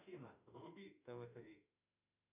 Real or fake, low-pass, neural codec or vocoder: fake; 3.6 kHz; autoencoder, 48 kHz, 32 numbers a frame, DAC-VAE, trained on Japanese speech